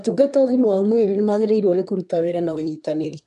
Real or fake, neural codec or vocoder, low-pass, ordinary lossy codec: fake; codec, 24 kHz, 1 kbps, SNAC; 10.8 kHz; none